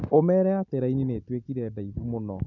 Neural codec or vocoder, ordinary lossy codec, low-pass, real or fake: none; MP3, 64 kbps; 7.2 kHz; real